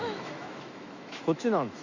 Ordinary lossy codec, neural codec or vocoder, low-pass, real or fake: Opus, 64 kbps; autoencoder, 48 kHz, 128 numbers a frame, DAC-VAE, trained on Japanese speech; 7.2 kHz; fake